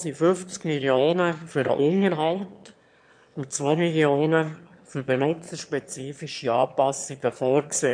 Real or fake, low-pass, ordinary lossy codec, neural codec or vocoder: fake; 9.9 kHz; MP3, 64 kbps; autoencoder, 22.05 kHz, a latent of 192 numbers a frame, VITS, trained on one speaker